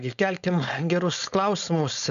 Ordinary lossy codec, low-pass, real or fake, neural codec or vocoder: MP3, 96 kbps; 7.2 kHz; fake; codec, 16 kHz, 4.8 kbps, FACodec